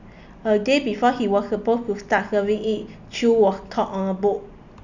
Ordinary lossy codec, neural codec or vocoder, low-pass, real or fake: none; none; 7.2 kHz; real